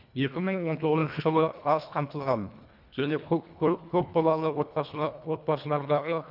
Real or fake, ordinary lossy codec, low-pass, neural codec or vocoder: fake; none; 5.4 kHz; codec, 24 kHz, 1.5 kbps, HILCodec